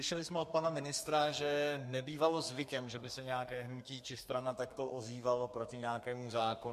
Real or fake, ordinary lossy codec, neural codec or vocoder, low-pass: fake; MP3, 64 kbps; codec, 32 kHz, 1.9 kbps, SNAC; 14.4 kHz